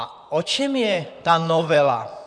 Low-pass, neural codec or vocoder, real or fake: 9.9 kHz; vocoder, 44.1 kHz, 128 mel bands, Pupu-Vocoder; fake